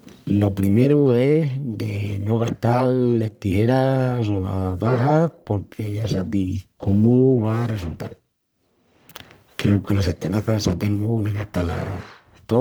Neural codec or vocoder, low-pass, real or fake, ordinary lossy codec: codec, 44.1 kHz, 1.7 kbps, Pupu-Codec; none; fake; none